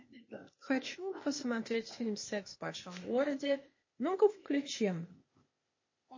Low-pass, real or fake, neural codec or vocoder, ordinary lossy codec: 7.2 kHz; fake; codec, 16 kHz, 0.8 kbps, ZipCodec; MP3, 32 kbps